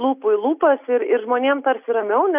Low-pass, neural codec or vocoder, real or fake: 3.6 kHz; none; real